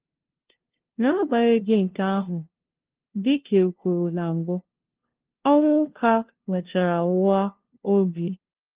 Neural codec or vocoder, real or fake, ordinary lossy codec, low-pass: codec, 16 kHz, 0.5 kbps, FunCodec, trained on LibriTTS, 25 frames a second; fake; Opus, 16 kbps; 3.6 kHz